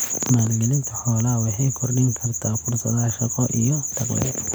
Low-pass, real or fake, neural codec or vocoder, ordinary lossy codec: none; real; none; none